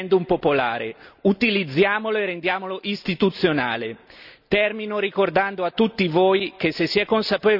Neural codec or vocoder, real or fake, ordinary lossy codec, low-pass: none; real; none; 5.4 kHz